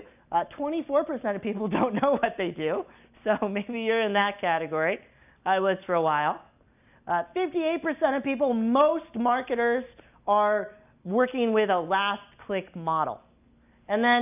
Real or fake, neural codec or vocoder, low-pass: real; none; 3.6 kHz